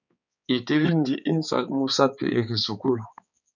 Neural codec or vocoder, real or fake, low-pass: codec, 16 kHz, 4 kbps, X-Codec, HuBERT features, trained on balanced general audio; fake; 7.2 kHz